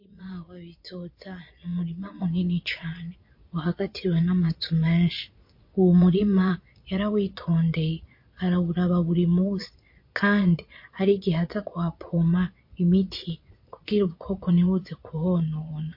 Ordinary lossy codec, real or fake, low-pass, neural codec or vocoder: MP3, 32 kbps; fake; 5.4 kHz; vocoder, 22.05 kHz, 80 mel bands, Vocos